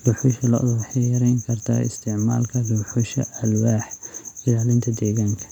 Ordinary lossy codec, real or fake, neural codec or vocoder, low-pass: none; real; none; 19.8 kHz